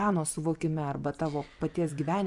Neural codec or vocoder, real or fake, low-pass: vocoder, 24 kHz, 100 mel bands, Vocos; fake; 10.8 kHz